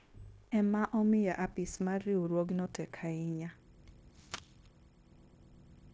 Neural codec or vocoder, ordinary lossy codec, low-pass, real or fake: codec, 16 kHz, 0.9 kbps, LongCat-Audio-Codec; none; none; fake